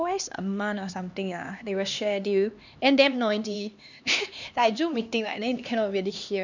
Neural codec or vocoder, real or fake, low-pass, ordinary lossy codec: codec, 16 kHz, 2 kbps, X-Codec, HuBERT features, trained on LibriSpeech; fake; 7.2 kHz; none